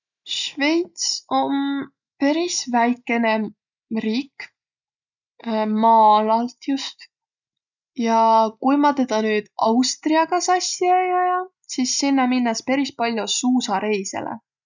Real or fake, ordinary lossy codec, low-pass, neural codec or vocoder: real; none; 7.2 kHz; none